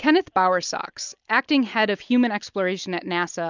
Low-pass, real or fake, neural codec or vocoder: 7.2 kHz; real; none